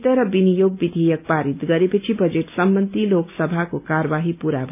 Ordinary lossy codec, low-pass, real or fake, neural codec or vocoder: none; 3.6 kHz; real; none